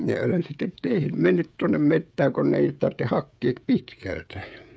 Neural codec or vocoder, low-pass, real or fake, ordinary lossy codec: codec, 16 kHz, 16 kbps, FreqCodec, smaller model; none; fake; none